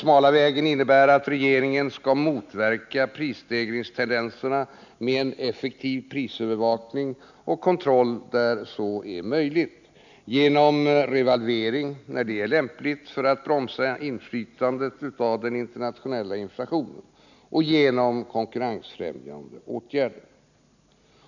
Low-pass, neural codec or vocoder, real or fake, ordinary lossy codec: 7.2 kHz; none; real; none